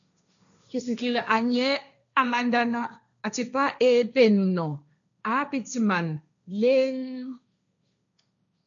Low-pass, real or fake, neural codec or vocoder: 7.2 kHz; fake; codec, 16 kHz, 1.1 kbps, Voila-Tokenizer